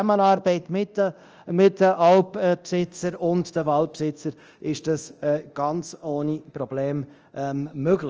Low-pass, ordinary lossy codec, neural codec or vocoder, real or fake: 7.2 kHz; Opus, 24 kbps; codec, 24 kHz, 0.9 kbps, DualCodec; fake